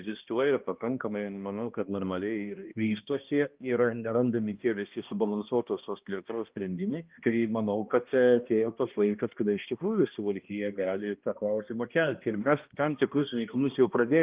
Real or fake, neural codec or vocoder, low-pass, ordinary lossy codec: fake; codec, 16 kHz, 1 kbps, X-Codec, HuBERT features, trained on balanced general audio; 3.6 kHz; Opus, 32 kbps